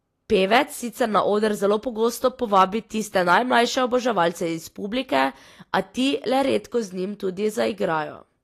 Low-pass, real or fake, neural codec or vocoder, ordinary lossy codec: 14.4 kHz; real; none; AAC, 48 kbps